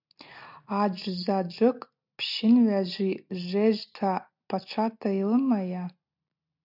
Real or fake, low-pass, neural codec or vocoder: real; 5.4 kHz; none